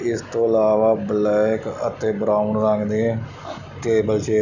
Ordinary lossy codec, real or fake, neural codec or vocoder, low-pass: none; real; none; 7.2 kHz